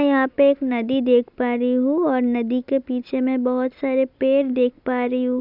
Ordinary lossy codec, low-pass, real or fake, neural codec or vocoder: Opus, 64 kbps; 5.4 kHz; real; none